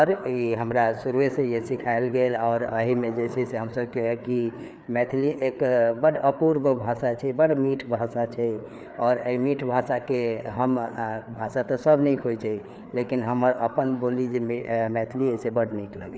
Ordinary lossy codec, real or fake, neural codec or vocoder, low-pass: none; fake; codec, 16 kHz, 4 kbps, FreqCodec, larger model; none